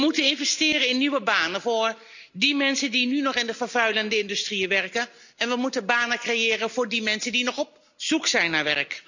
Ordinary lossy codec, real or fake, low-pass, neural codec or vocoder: none; real; 7.2 kHz; none